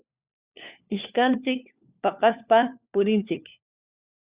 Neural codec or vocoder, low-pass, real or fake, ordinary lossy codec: codec, 16 kHz, 4 kbps, FunCodec, trained on LibriTTS, 50 frames a second; 3.6 kHz; fake; Opus, 64 kbps